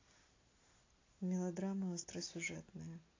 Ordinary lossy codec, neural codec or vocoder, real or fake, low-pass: AAC, 32 kbps; none; real; 7.2 kHz